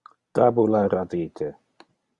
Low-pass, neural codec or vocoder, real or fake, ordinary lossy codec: 10.8 kHz; none; real; Opus, 64 kbps